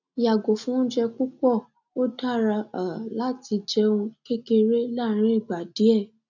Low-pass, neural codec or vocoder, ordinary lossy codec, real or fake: 7.2 kHz; none; none; real